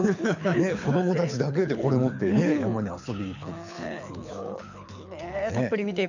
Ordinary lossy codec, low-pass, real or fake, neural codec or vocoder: none; 7.2 kHz; fake; codec, 24 kHz, 6 kbps, HILCodec